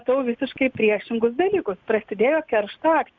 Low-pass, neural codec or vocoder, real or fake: 7.2 kHz; none; real